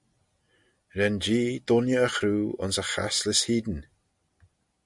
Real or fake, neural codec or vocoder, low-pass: real; none; 10.8 kHz